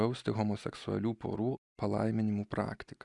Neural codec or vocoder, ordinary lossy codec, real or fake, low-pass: none; Opus, 64 kbps; real; 10.8 kHz